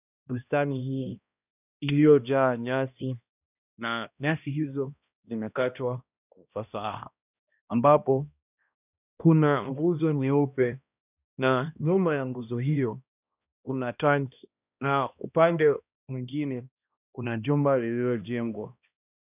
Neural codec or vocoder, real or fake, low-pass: codec, 16 kHz, 1 kbps, X-Codec, HuBERT features, trained on balanced general audio; fake; 3.6 kHz